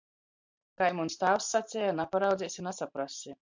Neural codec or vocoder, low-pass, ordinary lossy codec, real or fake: vocoder, 44.1 kHz, 128 mel bands every 512 samples, BigVGAN v2; 7.2 kHz; MP3, 64 kbps; fake